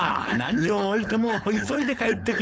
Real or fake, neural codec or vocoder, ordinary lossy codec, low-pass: fake; codec, 16 kHz, 4.8 kbps, FACodec; none; none